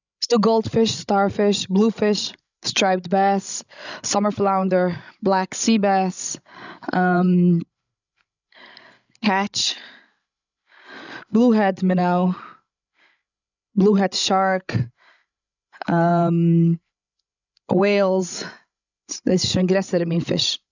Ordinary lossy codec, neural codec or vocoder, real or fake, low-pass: none; codec, 16 kHz, 16 kbps, FreqCodec, larger model; fake; 7.2 kHz